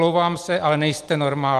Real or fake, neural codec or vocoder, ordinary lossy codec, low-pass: real; none; Opus, 24 kbps; 14.4 kHz